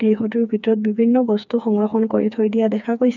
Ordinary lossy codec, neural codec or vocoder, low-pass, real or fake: none; codec, 16 kHz, 4 kbps, FreqCodec, smaller model; 7.2 kHz; fake